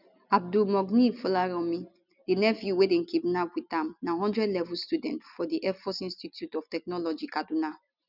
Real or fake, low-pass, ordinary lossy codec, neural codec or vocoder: real; 5.4 kHz; none; none